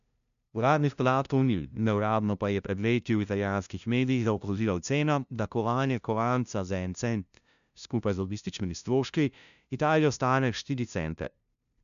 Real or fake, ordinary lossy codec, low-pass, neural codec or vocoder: fake; none; 7.2 kHz; codec, 16 kHz, 0.5 kbps, FunCodec, trained on LibriTTS, 25 frames a second